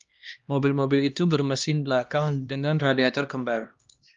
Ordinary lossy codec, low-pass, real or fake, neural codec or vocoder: Opus, 32 kbps; 7.2 kHz; fake; codec, 16 kHz, 1 kbps, X-Codec, HuBERT features, trained on LibriSpeech